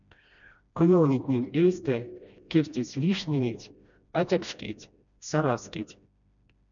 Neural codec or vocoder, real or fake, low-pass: codec, 16 kHz, 1 kbps, FreqCodec, smaller model; fake; 7.2 kHz